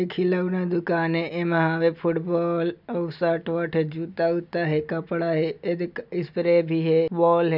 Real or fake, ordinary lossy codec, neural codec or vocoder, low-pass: real; none; none; 5.4 kHz